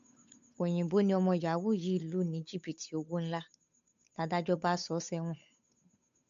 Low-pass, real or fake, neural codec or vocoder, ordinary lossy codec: 7.2 kHz; fake; codec, 16 kHz, 8 kbps, FunCodec, trained on Chinese and English, 25 frames a second; AAC, 64 kbps